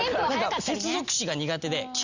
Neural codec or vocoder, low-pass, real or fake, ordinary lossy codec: none; 7.2 kHz; real; Opus, 64 kbps